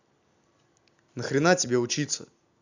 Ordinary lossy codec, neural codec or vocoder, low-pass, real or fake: none; vocoder, 44.1 kHz, 128 mel bands every 256 samples, BigVGAN v2; 7.2 kHz; fake